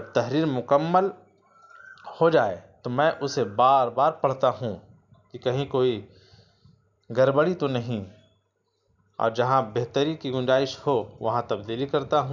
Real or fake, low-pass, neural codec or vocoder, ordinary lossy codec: real; 7.2 kHz; none; none